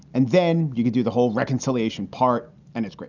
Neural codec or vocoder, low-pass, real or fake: none; 7.2 kHz; real